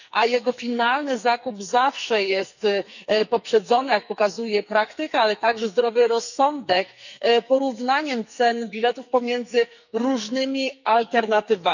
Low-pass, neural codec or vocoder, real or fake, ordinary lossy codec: 7.2 kHz; codec, 44.1 kHz, 2.6 kbps, SNAC; fake; none